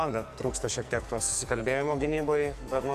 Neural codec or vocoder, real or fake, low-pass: codec, 44.1 kHz, 2.6 kbps, SNAC; fake; 14.4 kHz